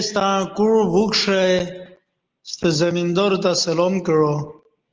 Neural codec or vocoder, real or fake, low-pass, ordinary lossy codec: none; real; 7.2 kHz; Opus, 24 kbps